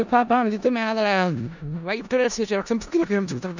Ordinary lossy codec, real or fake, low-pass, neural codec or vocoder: none; fake; 7.2 kHz; codec, 16 kHz in and 24 kHz out, 0.4 kbps, LongCat-Audio-Codec, four codebook decoder